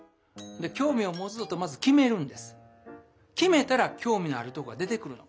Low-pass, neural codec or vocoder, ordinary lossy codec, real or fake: none; none; none; real